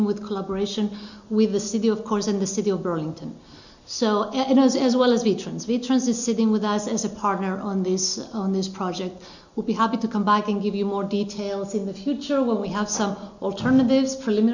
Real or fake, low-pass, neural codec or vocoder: real; 7.2 kHz; none